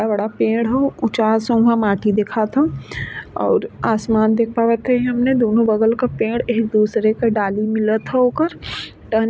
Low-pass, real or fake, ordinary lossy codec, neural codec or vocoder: none; real; none; none